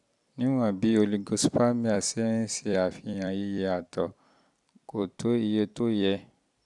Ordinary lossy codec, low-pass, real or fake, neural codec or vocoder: none; 10.8 kHz; fake; vocoder, 44.1 kHz, 128 mel bands every 256 samples, BigVGAN v2